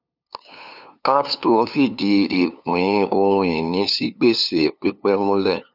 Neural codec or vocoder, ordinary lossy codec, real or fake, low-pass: codec, 16 kHz, 2 kbps, FunCodec, trained on LibriTTS, 25 frames a second; none; fake; 5.4 kHz